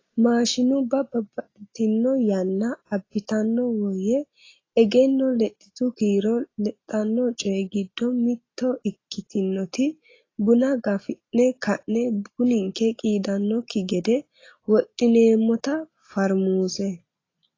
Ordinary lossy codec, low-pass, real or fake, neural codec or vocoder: AAC, 32 kbps; 7.2 kHz; real; none